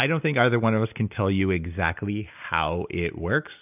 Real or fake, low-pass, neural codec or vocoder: real; 3.6 kHz; none